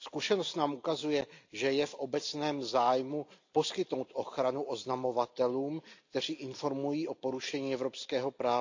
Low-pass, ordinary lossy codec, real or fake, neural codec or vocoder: 7.2 kHz; AAC, 48 kbps; real; none